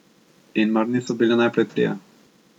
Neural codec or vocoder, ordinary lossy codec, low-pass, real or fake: none; none; 19.8 kHz; real